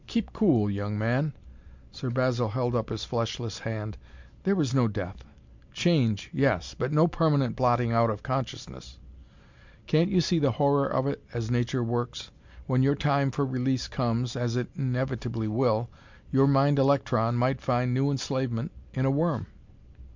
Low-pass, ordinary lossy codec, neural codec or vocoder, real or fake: 7.2 kHz; MP3, 64 kbps; none; real